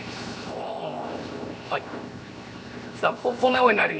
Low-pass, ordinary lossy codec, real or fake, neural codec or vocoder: none; none; fake; codec, 16 kHz, 0.7 kbps, FocalCodec